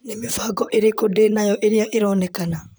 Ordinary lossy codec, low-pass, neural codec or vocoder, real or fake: none; none; vocoder, 44.1 kHz, 128 mel bands, Pupu-Vocoder; fake